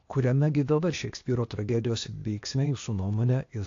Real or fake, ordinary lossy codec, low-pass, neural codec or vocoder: fake; AAC, 48 kbps; 7.2 kHz; codec, 16 kHz, 0.8 kbps, ZipCodec